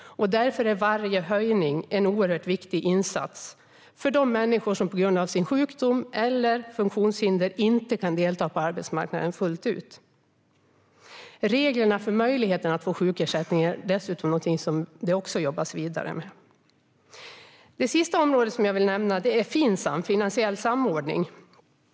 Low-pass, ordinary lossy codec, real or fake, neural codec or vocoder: none; none; real; none